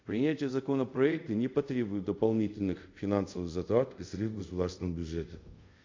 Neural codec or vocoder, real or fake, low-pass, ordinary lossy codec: codec, 24 kHz, 0.5 kbps, DualCodec; fake; 7.2 kHz; MP3, 48 kbps